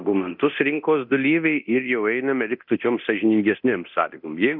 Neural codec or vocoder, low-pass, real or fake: codec, 24 kHz, 0.9 kbps, DualCodec; 5.4 kHz; fake